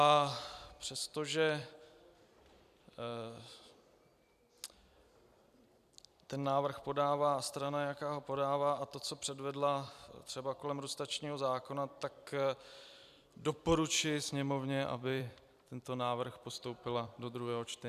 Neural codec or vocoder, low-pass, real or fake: vocoder, 44.1 kHz, 128 mel bands every 256 samples, BigVGAN v2; 14.4 kHz; fake